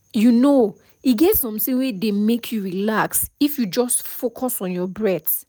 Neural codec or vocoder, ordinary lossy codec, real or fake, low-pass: none; none; real; none